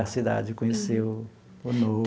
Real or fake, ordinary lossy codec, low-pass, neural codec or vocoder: real; none; none; none